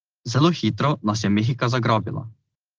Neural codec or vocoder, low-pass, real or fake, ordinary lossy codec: none; 7.2 kHz; real; Opus, 16 kbps